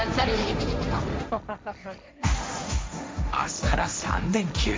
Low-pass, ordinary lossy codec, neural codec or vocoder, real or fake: none; none; codec, 16 kHz, 1.1 kbps, Voila-Tokenizer; fake